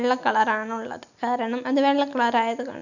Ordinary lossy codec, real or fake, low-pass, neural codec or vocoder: none; real; 7.2 kHz; none